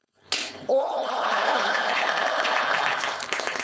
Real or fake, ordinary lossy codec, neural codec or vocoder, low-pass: fake; none; codec, 16 kHz, 4.8 kbps, FACodec; none